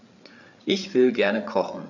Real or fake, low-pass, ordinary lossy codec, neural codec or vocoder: fake; 7.2 kHz; none; codec, 16 kHz, 8 kbps, FreqCodec, larger model